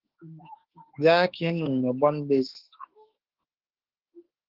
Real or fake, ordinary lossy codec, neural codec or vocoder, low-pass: fake; Opus, 16 kbps; autoencoder, 48 kHz, 32 numbers a frame, DAC-VAE, trained on Japanese speech; 5.4 kHz